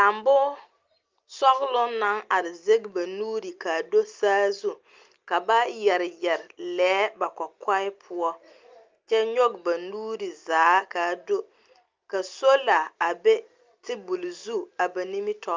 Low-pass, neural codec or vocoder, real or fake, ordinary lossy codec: 7.2 kHz; none; real; Opus, 24 kbps